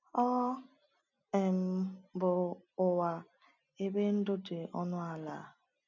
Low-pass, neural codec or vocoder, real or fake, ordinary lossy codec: 7.2 kHz; none; real; none